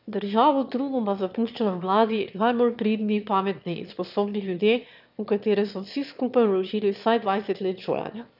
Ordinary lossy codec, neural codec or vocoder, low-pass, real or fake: none; autoencoder, 22.05 kHz, a latent of 192 numbers a frame, VITS, trained on one speaker; 5.4 kHz; fake